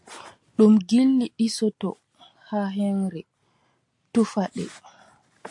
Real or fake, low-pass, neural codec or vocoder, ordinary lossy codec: real; 10.8 kHz; none; AAC, 64 kbps